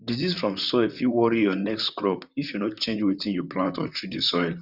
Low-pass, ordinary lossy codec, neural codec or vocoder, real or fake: 5.4 kHz; none; vocoder, 44.1 kHz, 128 mel bands, Pupu-Vocoder; fake